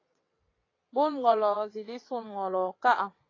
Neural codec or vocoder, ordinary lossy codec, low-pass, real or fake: vocoder, 22.05 kHz, 80 mel bands, WaveNeXt; AAC, 32 kbps; 7.2 kHz; fake